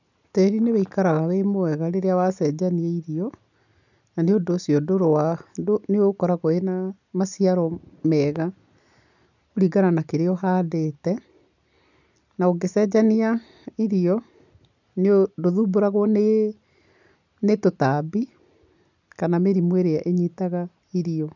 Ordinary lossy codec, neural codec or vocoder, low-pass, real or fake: none; none; 7.2 kHz; real